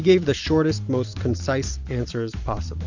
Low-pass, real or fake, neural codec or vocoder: 7.2 kHz; fake; vocoder, 44.1 kHz, 128 mel bands every 512 samples, BigVGAN v2